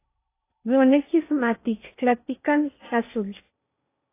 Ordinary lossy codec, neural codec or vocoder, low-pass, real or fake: AAC, 24 kbps; codec, 16 kHz in and 24 kHz out, 0.8 kbps, FocalCodec, streaming, 65536 codes; 3.6 kHz; fake